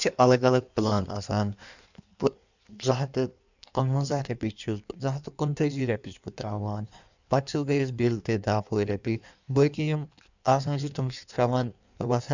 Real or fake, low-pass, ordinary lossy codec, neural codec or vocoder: fake; 7.2 kHz; none; codec, 16 kHz in and 24 kHz out, 1.1 kbps, FireRedTTS-2 codec